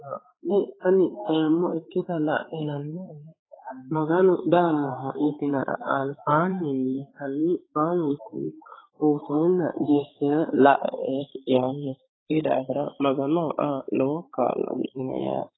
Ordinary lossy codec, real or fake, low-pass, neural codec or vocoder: AAC, 16 kbps; fake; 7.2 kHz; codec, 16 kHz, 4 kbps, X-Codec, HuBERT features, trained on balanced general audio